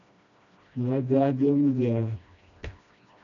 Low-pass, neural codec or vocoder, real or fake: 7.2 kHz; codec, 16 kHz, 1 kbps, FreqCodec, smaller model; fake